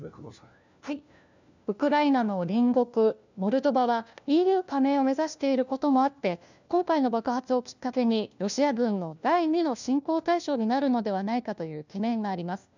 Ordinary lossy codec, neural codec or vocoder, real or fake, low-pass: none; codec, 16 kHz, 1 kbps, FunCodec, trained on LibriTTS, 50 frames a second; fake; 7.2 kHz